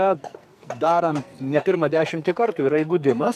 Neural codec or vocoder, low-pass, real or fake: codec, 44.1 kHz, 2.6 kbps, SNAC; 14.4 kHz; fake